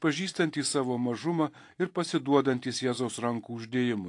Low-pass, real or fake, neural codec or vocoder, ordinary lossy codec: 10.8 kHz; fake; vocoder, 44.1 kHz, 128 mel bands every 256 samples, BigVGAN v2; AAC, 48 kbps